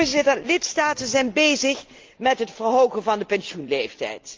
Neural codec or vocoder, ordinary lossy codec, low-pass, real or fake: none; Opus, 24 kbps; 7.2 kHz; real